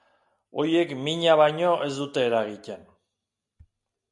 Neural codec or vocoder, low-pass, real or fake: none; 10.8 kHz; real